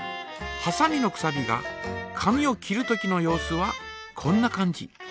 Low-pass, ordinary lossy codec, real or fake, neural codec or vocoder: none; none; real; none